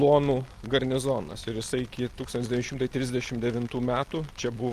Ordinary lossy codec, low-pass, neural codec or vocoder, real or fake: Opus, 32 kbps; 14.4 kHz; none; real